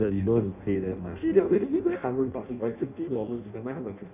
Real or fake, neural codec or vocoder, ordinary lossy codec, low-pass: fake; codec, 16 kHz in and 24 kHz out, 0.6 kbps, FireRedTTS-2 codec; none; 3.6 kHz